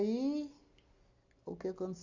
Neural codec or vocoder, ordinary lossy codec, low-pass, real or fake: none; Opus, 64 kbps; 7.2 kHz; real